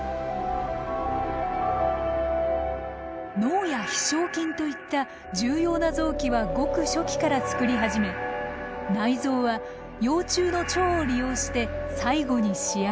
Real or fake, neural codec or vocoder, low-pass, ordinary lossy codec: real; none; none; none